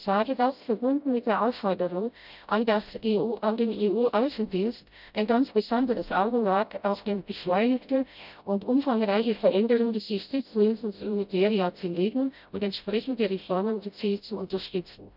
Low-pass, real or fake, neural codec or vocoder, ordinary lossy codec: 5.4 kHz; fake; codec, 16 kHz, 0.5 kbps, FreqCodec, smaller model; none